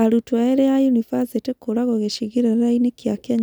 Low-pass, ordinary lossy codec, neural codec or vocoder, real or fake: none; none; none; real